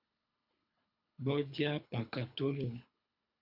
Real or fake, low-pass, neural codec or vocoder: fake; 5.4 kHz; codec, 24 kHz, 3 kbps, HILCodec